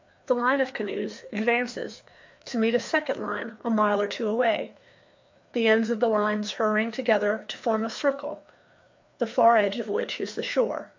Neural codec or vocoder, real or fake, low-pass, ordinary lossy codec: codec, 16 kHz, 2 kbps, FreqCodec, larger model; fake; 7.2 kHz; MP3, 48 kbps